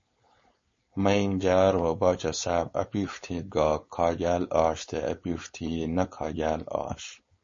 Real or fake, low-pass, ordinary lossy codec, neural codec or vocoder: fake; 7.2 kHz; MP3, 32 kbps; codec, 16 kHz, 4.8 kbps, FACodec